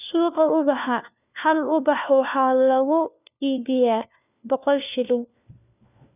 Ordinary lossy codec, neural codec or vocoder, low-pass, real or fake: none; codec, 16 kHz, 1 kbps, FunCodec, trained on LibriTTS, 50 frames a second; 3.6 kHz; fake